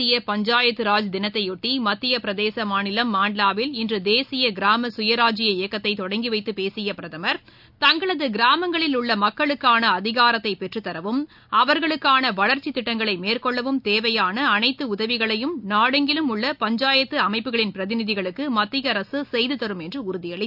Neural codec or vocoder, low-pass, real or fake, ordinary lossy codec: none; 5.4 kHz; real; none